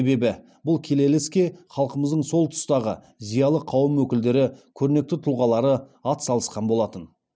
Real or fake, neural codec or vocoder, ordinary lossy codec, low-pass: real; none; none; none